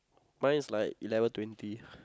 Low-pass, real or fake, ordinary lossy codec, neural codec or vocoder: none; real; none; none